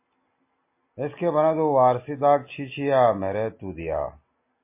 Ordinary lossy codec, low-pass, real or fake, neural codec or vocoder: MP3, 24 kbps; 3.6 kHz; real; none